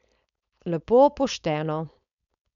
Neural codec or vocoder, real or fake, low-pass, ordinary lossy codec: codec, 16 kHz, 4.8 kbps, FACodec; fake; 7.2 kHz; none